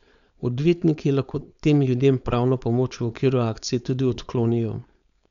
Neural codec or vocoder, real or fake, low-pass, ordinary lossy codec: codec, 16 kHz, 4.8 kbps, FACodec; fake; 7.2 kHz; none